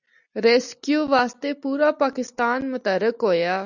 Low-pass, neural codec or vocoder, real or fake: 7.2 kHz; none; real